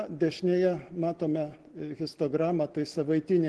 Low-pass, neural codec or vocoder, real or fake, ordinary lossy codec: 10.8 kHz; none; real; Opus, 16 kbps